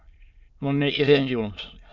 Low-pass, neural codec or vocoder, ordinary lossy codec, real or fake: 7.2 kHz; autoencoder, 22.05 kHz, a latent of 192 numbers a frame, VITS, trained on many speakers; Opus, 64 kbps; fake